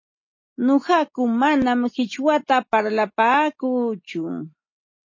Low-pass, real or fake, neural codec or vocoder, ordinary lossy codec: 7.2 kHz; real; none; MP3, 32 kbps